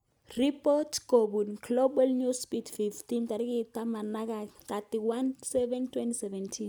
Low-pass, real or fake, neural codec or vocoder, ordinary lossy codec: none; real; none; none